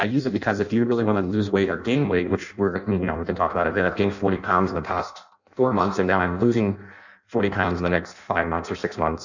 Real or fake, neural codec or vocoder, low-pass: fake; codec, 16 kHz in and 24 kHz out, 0.6 kbps, FireRedTTS-2 codec; 7.2 kHz